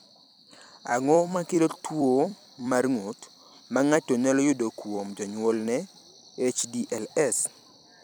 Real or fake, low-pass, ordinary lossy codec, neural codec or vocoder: fake; none; none; vocoder, 44.1 kHz, 128 mel bands every 256 samples, BigVGAN v2